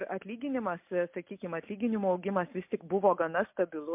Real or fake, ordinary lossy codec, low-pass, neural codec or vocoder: real; MP3, 32 kbps; 3.6 kHz; none